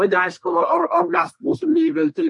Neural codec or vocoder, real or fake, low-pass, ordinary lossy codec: codec, 24 kHz, 1 kbps, SNAC; fake; 10.8 kHz; MP3, 48 kbps